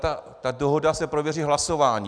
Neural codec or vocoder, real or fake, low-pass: none; real; 9.9 kHz